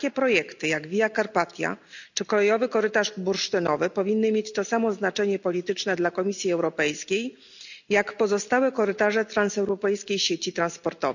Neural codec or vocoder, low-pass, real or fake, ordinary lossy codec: none; 7.2 kHz; real; none